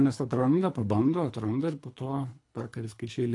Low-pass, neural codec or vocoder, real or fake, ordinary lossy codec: 10.8 kHz; codec, 24 kHz, 3 kbps, HILCodec; fake; AAC, 48 kbps